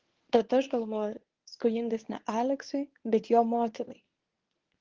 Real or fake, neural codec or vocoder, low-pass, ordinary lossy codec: fake; codec, 24 kHz, 0.9 kbps, WavTokenizer, medium speech release version 1; 7.2 kHz; Opus, 16 kbps